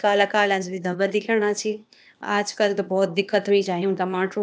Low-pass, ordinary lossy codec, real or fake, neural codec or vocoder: none; none; fake; codec, 16 kHz, 0.8 kbps, ZipCodec